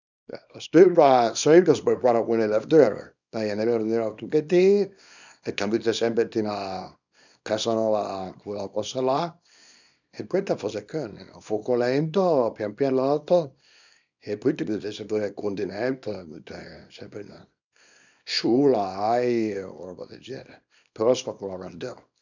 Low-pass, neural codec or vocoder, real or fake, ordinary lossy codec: 7.2 kHz; codec, 24 kHz, 0.9 kbps, WavTokenizer, small release; fake; none